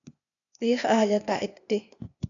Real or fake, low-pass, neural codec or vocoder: fake; 7.2 kHz; codec, 16 kHz, 0.8 kbps, ZipCodec